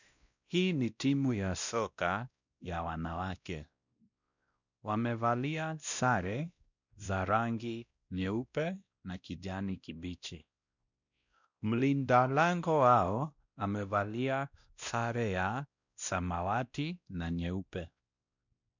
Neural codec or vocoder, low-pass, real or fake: codec, 16 kHz, 1 kbps, X-Codec, WavLM features, trained on Multilingual LibriSpeech; 7.2 kHz; fake